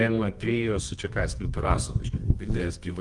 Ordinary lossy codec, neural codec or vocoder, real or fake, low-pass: Opus, 32 kbps; codec, 24 kHz, 0.9 kbps, WavTokenizer, medium music audio release; fake; 10.8 kHz